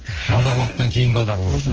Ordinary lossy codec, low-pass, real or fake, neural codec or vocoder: Opus, 16 kbps; 7.2 kHz; fake; codec, 44.1 kHz, 2.6 kbps, DAC